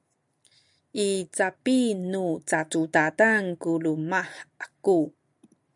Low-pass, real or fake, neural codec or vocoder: 10.8 kHz; real; none